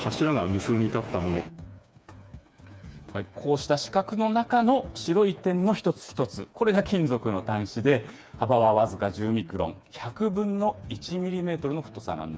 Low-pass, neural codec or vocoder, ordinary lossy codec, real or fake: none; codec, 16 kHz, 4 kbps, FreqCodec, smaller model; none; fake